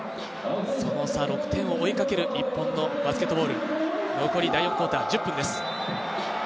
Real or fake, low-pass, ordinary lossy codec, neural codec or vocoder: real; none; none; none